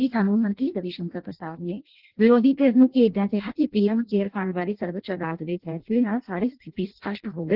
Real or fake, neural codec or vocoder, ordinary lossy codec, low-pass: fake; codec, 16 kHz in and 24 kHz out, 0.6 kbps, FireRedTTS-2 codec; Opus, 16 kbps; 5.4 kHz